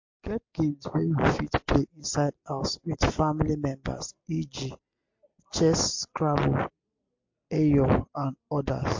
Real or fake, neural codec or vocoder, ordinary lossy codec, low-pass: real; none; MP3, 48 kbps; 7.2 kHz